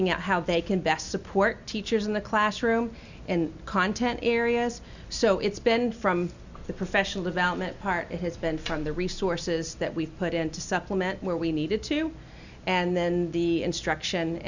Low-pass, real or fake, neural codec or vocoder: 7.2 kHz; real; none